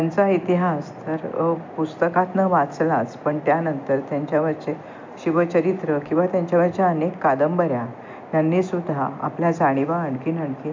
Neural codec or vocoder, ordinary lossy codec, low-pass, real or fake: none; MP3, 64 kbps; 7.2 kHz; real